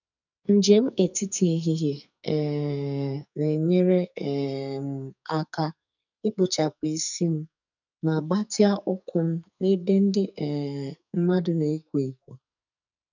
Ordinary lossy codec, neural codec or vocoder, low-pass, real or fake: none; codec, 44.1 kHz, 2.6 kbps, SNAC; 7.2 kHz; fake